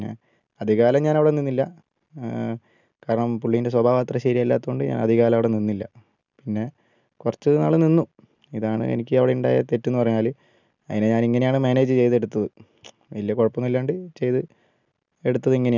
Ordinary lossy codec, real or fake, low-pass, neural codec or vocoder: none; real; 7.2 kHz; none